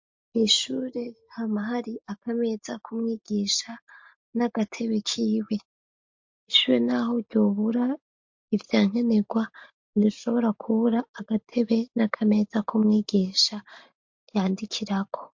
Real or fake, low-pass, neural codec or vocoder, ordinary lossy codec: real; 7.2 kHz; none; MP3, 48 kbps